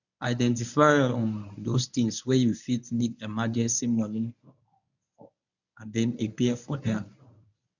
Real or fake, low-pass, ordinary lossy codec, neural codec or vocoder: fake; 7.2 kHz; none; codec, 24 kHz, 0.9 kbps, WavTokenizer, medium speech release version 1